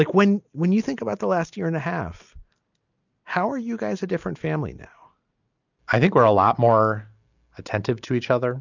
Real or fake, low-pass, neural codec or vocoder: real; 7.2 kHz; none